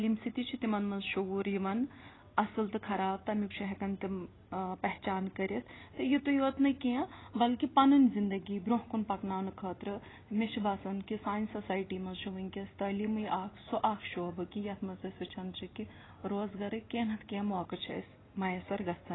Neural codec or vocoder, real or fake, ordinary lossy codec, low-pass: none; real; AAC, 16 kbps; 7.2 kHz